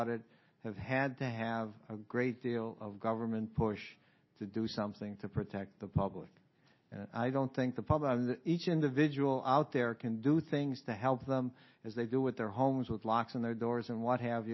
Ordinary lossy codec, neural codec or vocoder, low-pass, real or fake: MP3, 24 kbps; none; 7.2 kHz; real